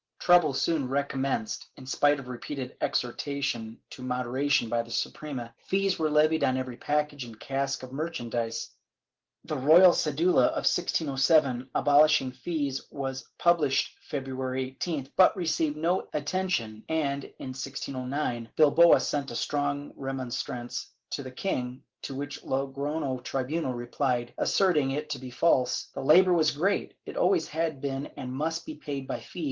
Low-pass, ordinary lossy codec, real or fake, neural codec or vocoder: 7.2 kHz; Opus, 16 kbps; real; none